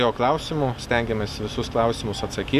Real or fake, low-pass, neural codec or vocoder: real; 14.4 kHz; none